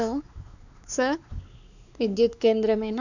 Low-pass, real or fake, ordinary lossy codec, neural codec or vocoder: 7.2 kHz; fake; none; codec, 16 kHz, 4 kbps, X-Codec, WavLM features, trained on Multilingual LibriSpeech